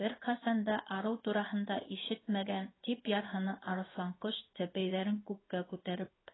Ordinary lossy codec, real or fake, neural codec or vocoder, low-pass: AAC, 16 kbps; fake; codec, 16 kHz in and 24 kHz out, 1 kbps, XY-Tokenizer; 7.2 kHz